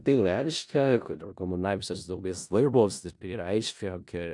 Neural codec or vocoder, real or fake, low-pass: codec, 16 kHz in and 24 kHz out, 0.4 kbps, LongCat-Audio-Codec, four codebook decoder; fake; 10.8 kHz